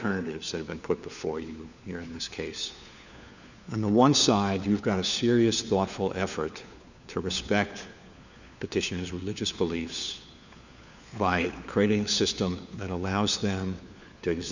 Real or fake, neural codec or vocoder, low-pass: fake; codec, 16 kHz, 4 kbps, FunCodec, trained on LibriTTS, 50 frames a second; 7.2 kHz